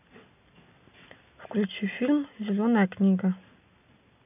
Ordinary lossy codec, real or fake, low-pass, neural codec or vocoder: none; real; 3.6 kHz; none